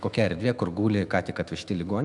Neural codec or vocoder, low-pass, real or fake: autoencoder, 48 kHz, 128 numbers a frame, DAC-VAE, trained on Japanese speech; 10.8 kHz; fake